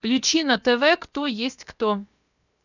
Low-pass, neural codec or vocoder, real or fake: 7.2 kHz; codec, 16 kHz, 0.7 kbps, FocalCodec; fake